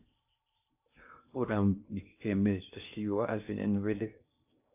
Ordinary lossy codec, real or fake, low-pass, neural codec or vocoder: AAC, 32 kbps; fake; 3.6 kHz; codec, 16 kHz in and 24 kHz out, 0.6 kbps, FocalCodec, streaming, 2048 codes